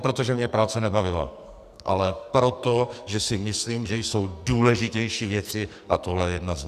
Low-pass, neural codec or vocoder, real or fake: 14.4 kHz; codec, 44.1 kHz, 2.6 kbps, SNAC; fake